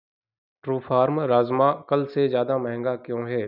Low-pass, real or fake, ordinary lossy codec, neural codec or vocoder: 5.4 kHz; real; none; none